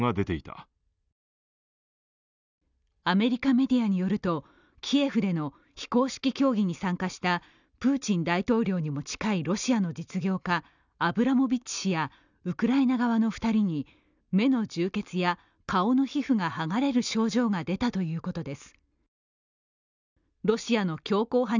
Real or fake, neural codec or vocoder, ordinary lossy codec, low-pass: real; none; none; 7.2 kHz